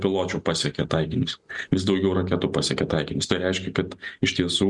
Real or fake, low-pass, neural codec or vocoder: real; 10.8 kHz; none